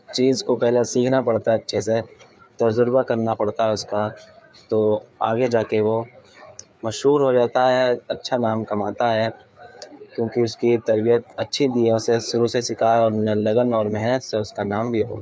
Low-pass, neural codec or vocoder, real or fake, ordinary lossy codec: none; codec, 16 kHz, 4 kbps, FreqCodec, larger model; fake; none